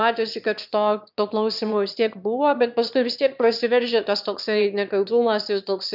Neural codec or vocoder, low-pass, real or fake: autoencoder, 22.05 kHz, a latent of 192 numbers a frame, VITS, trained on one speaker; 5.4 kHz; fake